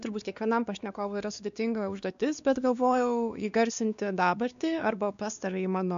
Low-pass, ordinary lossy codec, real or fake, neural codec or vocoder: 7.2 kHz; AAC, 96 kbps; fake; codec, 16 kHz, 2 kbps, X-Codec, WavLM features, trained on Multilingual LibriSpeech